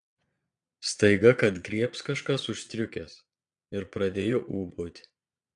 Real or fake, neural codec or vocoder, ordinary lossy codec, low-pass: fake; vocoder, 22.05 kHz, 80 mel bands, Vocos; AAC, 64 kbps; 9.9 kHz